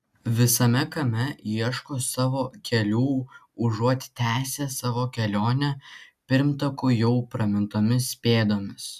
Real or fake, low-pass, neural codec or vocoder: real; 14.4 kHz; none